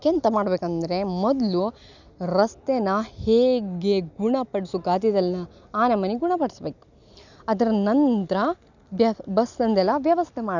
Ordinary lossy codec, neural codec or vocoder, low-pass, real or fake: none; none; 7.2 kHz; real